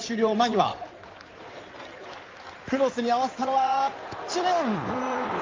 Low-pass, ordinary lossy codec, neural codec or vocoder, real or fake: 7.2 kHz; Opus, 24 kbps; codec, 16 kHz in and 24 kHz out, 2.2 kbps, FireRedTTS-2 codec; fake